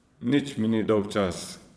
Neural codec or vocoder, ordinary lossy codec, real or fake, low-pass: vocoder, 22.05 kHz, 80 mel bands, Vocos; none; fake; none